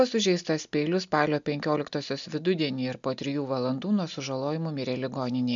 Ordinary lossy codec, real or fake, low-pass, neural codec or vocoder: MP3, 64 kbps; real; 7.2 kHz; none